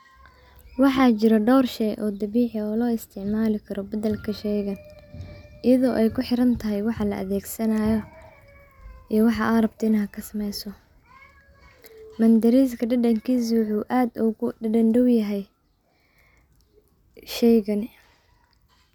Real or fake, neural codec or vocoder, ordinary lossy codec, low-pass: real; none; none; 19.8 kHz